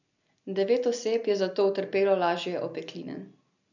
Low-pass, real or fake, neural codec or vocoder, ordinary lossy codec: 7.2 kHz; real; none; none